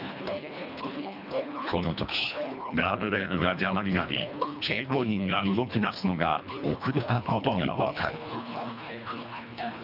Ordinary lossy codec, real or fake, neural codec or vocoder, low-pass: none; fake; codec, 24 kHz, 1.5 kbps, HILCodec; 5.4 kHz